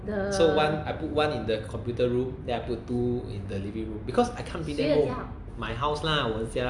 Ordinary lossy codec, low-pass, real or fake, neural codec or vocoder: AAC, 64 kbps; 10.8 kHz; real; none